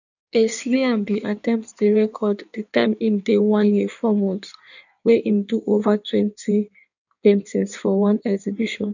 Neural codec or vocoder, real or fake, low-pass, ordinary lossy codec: codec, 16 kHz in and 24 kHz out, 1.1 kbps, FireRedTTS-2 codec; fake; 7.2 kHz; none